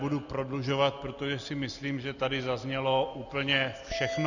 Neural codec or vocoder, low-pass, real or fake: none; 7.2 kHz; real